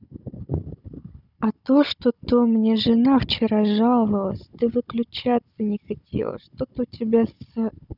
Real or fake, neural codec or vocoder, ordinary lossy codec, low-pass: fake; codec, 16 kHz, 16 kbps, FunCodec, trained on Chinese and English, 50 frames a second; none; 5.4 kHz